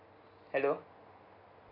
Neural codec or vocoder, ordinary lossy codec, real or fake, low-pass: none; none; real; 5.4 kHz